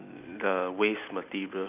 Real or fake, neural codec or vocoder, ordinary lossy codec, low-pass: real; none; none; 3.6 kHz